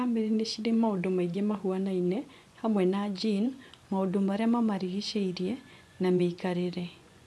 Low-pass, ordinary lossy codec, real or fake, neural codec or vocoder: none; none; fake; vocoder, 24 kHz, 100 mel bands, Vocos